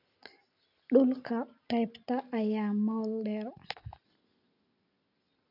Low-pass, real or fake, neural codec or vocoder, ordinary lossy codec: 5.4 kHz; real; none; none